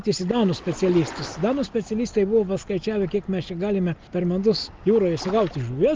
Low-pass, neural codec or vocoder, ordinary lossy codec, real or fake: 7.2 kHz; none; Opus, 32 kbps; real